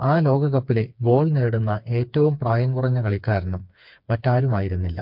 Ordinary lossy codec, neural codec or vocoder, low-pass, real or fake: MP3, 48 kbps; codec, 16 kHz, 4 kbps, FreqCodec, smaller model; 5.4 kHz; fake